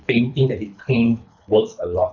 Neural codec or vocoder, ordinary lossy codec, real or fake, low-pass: codec, 24 kHz, 3 kbps, HILCodec; none; fake; 7.2 kHz